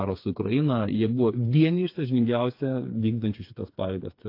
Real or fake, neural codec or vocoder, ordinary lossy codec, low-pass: fake; codec, 16 kHz, 4 kbps, FreqCodec, smaller model; AAC, 32 kbps; 5.4 kHz